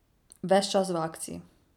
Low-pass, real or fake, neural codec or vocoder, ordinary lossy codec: 19.8 kHz; real; none; none